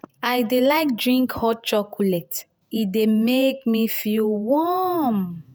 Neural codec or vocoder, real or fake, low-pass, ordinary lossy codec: vocoder, 48 kHz, 128 mel bands, Vocos; fake; none; none